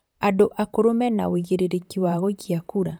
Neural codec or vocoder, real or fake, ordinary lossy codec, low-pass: vocoder, 44.1 kHz, 128 mel bands, Pupu-Vocoder; fake; none; none